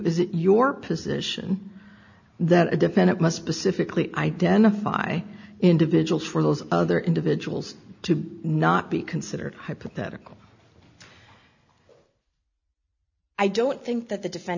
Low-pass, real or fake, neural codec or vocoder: 7.2 kHz; real; none